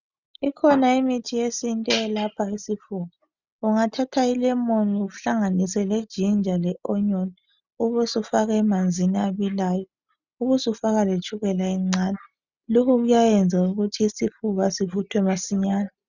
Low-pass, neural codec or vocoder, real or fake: 7.2 kHz; none; real